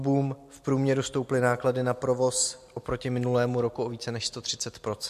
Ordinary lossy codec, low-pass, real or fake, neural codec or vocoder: MP3, 64 kbps; 14.4 kHz; real; none